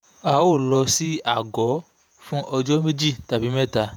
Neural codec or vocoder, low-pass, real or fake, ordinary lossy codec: vocoder, 48 kHz, 128 mel bands, Vocos; none; fake; none